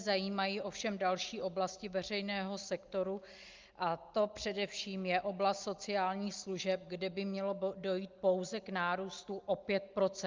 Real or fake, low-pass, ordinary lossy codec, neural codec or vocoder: real; 7.2 kHz; Opus, 24 kbps; none